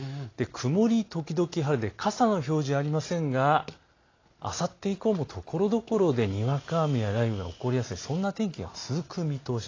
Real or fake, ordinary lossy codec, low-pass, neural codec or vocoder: real; AAC, 32 kbps; 7.2 kHz; none